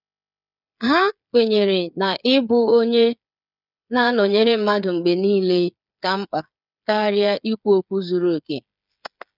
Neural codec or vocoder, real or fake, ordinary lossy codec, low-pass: codec, 16 kHz, 8 kbps, FreqCodec, smaller model; fake; none; 5.4 kHz